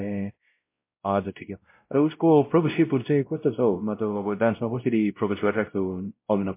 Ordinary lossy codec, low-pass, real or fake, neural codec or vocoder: MP3, 24 kbps; 3.6 kHz; fake; codec, 16 kHz, 0.5 kbps, X-Codec, WavLM features, trained on Multilingual LibriSpeech